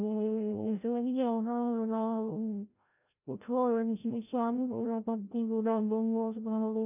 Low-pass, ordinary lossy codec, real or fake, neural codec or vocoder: 3.6 kHz; none; fake; codec, 16 kHz, 0.5 kbps, FreqCodec, larger model